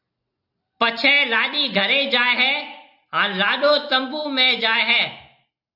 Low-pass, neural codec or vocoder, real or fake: 5.4 kHz; none; real